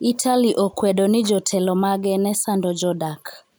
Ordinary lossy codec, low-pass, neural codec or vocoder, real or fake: none; none; none; real